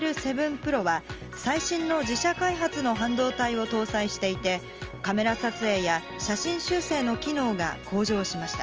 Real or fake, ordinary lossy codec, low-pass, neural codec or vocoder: real; Opus, 24 kbps; 7.2 kHz; none